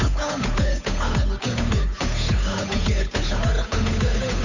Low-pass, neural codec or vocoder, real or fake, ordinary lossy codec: 7.2 kHz; codec, 16 kHz, 8 kbps, FunCodec, trained on Chinese and English, 25 frames a second; fake; none